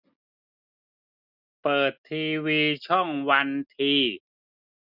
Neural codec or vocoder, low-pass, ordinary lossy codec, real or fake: none; 5.4 kHz; none; real